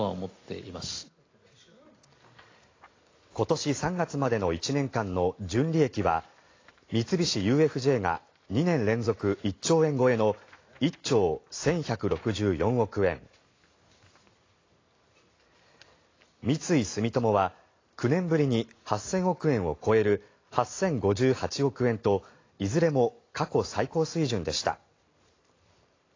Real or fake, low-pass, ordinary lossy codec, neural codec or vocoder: real; 7.2 kHz; AAC, 32 kbps; none